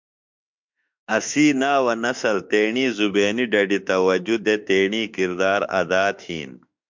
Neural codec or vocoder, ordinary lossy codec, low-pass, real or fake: autoencoder, 48 kHz, 32 numbers a frame, DAC-VAE, trained on Japanese speech; MP3, 64 kbps; 7.2 kHz; fake